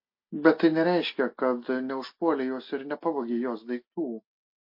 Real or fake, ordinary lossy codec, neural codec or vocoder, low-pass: real; MP3, 32 kbps; none; 5.4 kHz